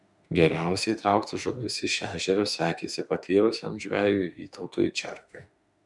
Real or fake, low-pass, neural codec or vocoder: fake; 10.8 kHz; autoencoder, 48 kHz, 32 numbers a frame, DAC-VAE, trained on Japanese speech